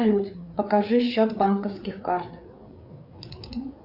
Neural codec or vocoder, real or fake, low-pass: codec, 16 kHz, 4 kbps, FreqCodec, larger model; fake; 5.4 kHz